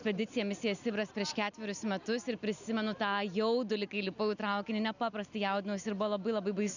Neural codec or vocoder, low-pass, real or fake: none; 7.2 kHz; real